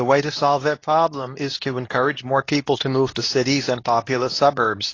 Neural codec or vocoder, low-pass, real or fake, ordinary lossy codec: codec, 24 kHz, 0.9 kbps, WavTokenizer, medium speech release version 2; 7.2 kHz; fake; AAC, 32 kbps